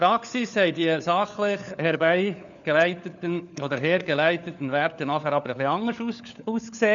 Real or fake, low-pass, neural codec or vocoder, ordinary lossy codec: fake; 7.2 kHz; codec, 16 kHz, 4 kbps, FunCodec, trained on LibriTTS, 50 frames a second; none